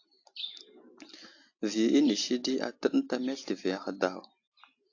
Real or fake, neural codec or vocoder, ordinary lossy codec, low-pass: real; none; AAC, 48 kbps; 7.2 kHz